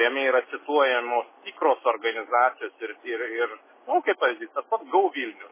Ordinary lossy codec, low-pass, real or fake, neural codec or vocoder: MP3, 16 kbps; 3.6 kHz; real; none